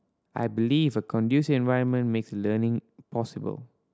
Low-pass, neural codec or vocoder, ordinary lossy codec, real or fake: none; none; none; real